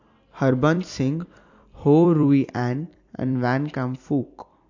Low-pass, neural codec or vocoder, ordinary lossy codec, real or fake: 7.2 kHz; vocoder, 44.1 kHz, 128 mel bands every 256 samples, BigVGAN v2; AAC, 48 kbps; fake